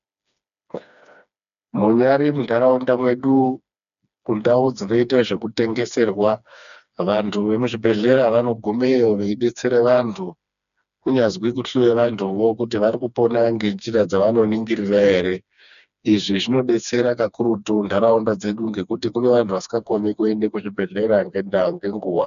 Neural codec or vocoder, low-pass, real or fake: codec, 16 kHz, 2 kbps, FreqCodec, smaller model; 7.2 kHz; fake